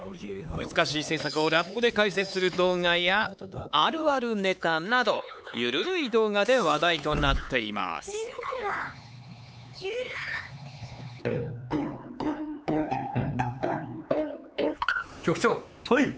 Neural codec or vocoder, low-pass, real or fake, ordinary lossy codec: codec, 16 kHz, 4 kbps, X-Codec, HuBERT features, trained on LibriSpeech; none; fake; none